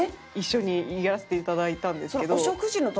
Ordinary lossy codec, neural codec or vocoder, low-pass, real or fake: none; none; none; real